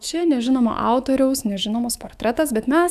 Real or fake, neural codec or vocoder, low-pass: fake; autoencoder, 48 kHz, 128 numbers a frame, DAC-VAE, trained on Japanese speech; 14.4 kHz